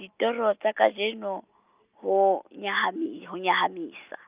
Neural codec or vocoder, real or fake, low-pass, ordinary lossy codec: none; real; 3.6 kHz; Opus, 24 kbps